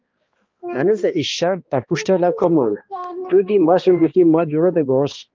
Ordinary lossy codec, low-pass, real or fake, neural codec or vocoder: Opus, 24 kbps; 7.2 kHz; fake; codec, 16 kHz, 1 kbps, X-Codec, HuBERT features, trained on balanced general audio